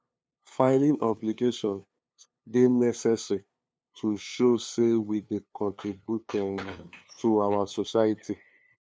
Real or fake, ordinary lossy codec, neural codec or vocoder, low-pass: fake; none; codec, 16 kHz, 2 kbps, FunCodec, trained on LibriTTS, 25 frames a second; none